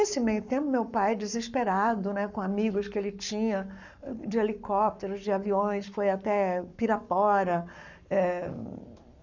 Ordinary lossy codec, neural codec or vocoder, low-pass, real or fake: none; codec, 44.1 kHz, 7.8 kbps, Pupu-Codec; 7.2 kHz; fake